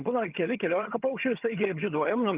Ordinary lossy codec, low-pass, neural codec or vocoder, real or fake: Opus, 32 kbps; 3.6 kHz; vocoder, 22.05 kHz, 80 mel bands, HiFi-GAN; fake